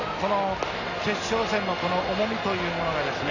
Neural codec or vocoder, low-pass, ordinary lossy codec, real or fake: none; 7.2 kHz; none; real